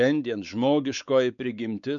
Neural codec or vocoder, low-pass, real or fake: codec, 16 kHz, 4 kbps, X-Codec, WavLM features, trained on Multilingual LibriSpeech; 7.2 kHz; fake